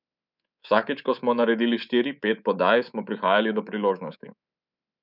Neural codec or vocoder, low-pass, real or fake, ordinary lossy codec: codec, 24 kHz, 3.1 kbps, DualCodec; 5.4 kHz; fake; none